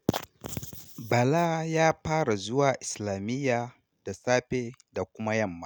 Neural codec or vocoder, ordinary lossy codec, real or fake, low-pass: none; none; real; none